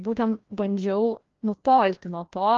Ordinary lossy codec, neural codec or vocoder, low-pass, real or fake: Opus, 24 kbps; codec, 16 kHz, 1 kbps, FreqCodec, larger model; 7.2 kHz; fake